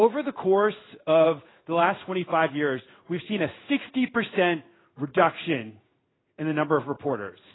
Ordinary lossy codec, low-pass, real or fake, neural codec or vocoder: AAC, 16 kbps; 7.2 kHz; fake; codec, 16 kHz in and 24 kHz out, 1 kbps, XY-Tokenizer